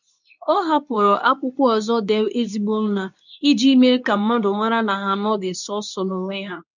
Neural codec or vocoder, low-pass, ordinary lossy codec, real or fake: codec, 24 kHz, 0.9 kbps, WavTokenizer, medium speech release version 2; 7.2 kHz; none; fake